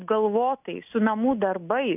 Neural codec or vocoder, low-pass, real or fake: none; 3.6 kHz; real